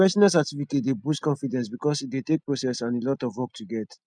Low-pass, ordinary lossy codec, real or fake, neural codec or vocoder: 9.9 kHz; none; real; none